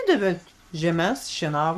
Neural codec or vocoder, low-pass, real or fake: codec, 44.1 kHz, 7.8 kbps, Pupu-Codec; 14.4 kHz; fake